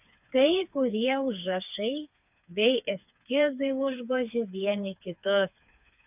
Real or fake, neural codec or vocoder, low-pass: fake; codec, 16 kHz, 4 kbps, FreqCodec, smaller model; 3.6 kHz